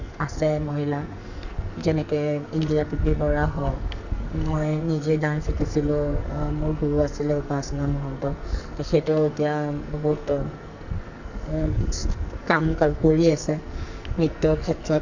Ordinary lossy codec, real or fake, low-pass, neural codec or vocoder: none; fake; 7.2 kHz; codec, 44.1 kHz, 2.6 kbps, SNAC